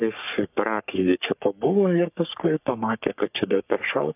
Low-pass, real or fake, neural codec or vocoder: 3.6 kHz; fake; codec, 44.1 kHz, 3.4 kbps, Pupu-Codec